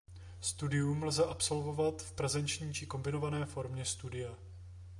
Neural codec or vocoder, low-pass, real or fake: none; 10.8 kHz; real